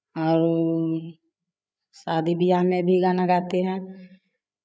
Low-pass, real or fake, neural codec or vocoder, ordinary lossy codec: none; fake; codec, 16 kHz, 8 kbps, FreqCodec, larger model; none